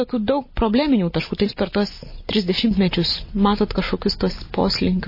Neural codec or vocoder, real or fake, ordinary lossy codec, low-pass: none; real; MP3, 24 kbps; 5.4 kHz